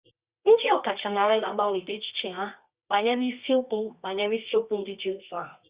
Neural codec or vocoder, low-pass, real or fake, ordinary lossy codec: codec, 24 kHz, 0.9 kbps, WavTokenizer, medium music audio release; 3.6 kHz; fake; Opus, 64 kbps